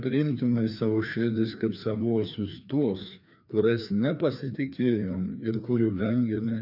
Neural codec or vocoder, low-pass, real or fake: codec, 16 kHz, 2 kbps, FreqCodec, larger model; 5.4 kHz; fake